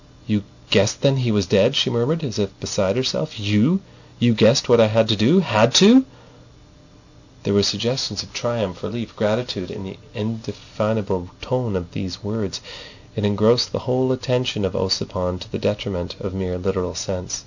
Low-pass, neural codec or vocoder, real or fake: 7.2 kHz; none; real